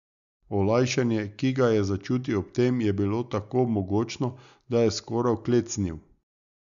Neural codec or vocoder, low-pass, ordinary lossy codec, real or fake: none; 7.2 kHz; none; real